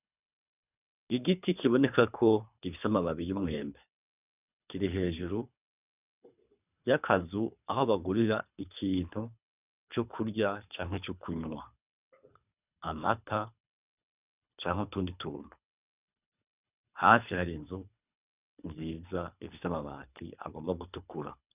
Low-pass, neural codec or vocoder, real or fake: 3.6 kHz; codec, 24 kHz, 3 kbps, HILCodec; fake